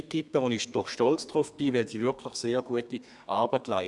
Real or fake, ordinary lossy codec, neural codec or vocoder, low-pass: fake; none; codec, 32 kHz, 1.9 kbps, SNAC; 10.8 kHz